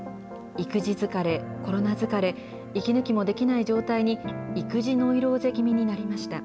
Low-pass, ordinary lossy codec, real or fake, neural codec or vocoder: none; none; real; none